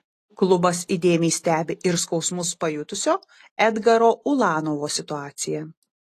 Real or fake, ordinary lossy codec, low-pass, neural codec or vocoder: real; AAC, 48 kbps; 14.4 kHz; none